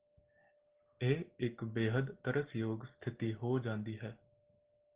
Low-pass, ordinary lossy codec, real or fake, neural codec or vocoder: 3.6 kHz; Opus, 16 kbps; real; none